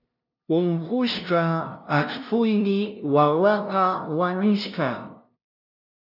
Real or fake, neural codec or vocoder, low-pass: fake; codec, 16 kHz, 0.5 kbps, FunCodec, trained on LibriTTS, 25 frames a second; 5.4 kHz